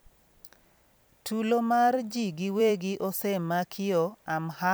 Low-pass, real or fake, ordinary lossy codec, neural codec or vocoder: none; fake; none; vocoder, 44.1 kHz, 128 mel bands every 256 samples, BigVGAN v2